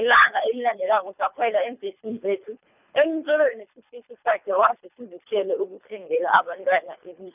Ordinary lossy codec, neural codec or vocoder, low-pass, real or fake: none; codec, 24 kHz, 3 kbps, HILCodec; 3.6 kHz; fake